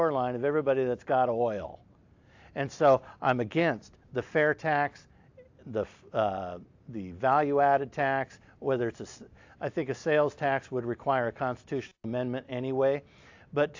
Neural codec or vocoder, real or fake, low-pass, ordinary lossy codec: none; real; 7.2 kHz; AAC, 48 kbps